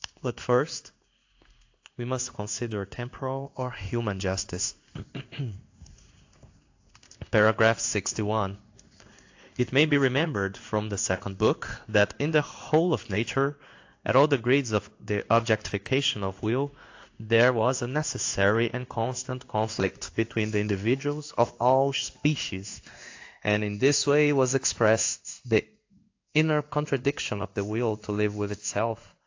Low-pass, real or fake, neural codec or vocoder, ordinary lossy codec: 7.2 kHz; fake; codec, 16 kHz in and 24 kHz out, 1 kbps, XY-Tokenizer; AAC, 48 kbps